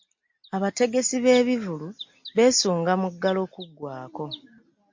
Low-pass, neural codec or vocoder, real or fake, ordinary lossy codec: 7.2 kHz; none; real; MP3, 48 kbps